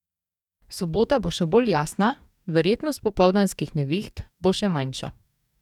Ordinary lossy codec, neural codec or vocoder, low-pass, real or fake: none; codec, 44.1 kHz, 2.6 kbps, DAC; 19.8 kHz; fake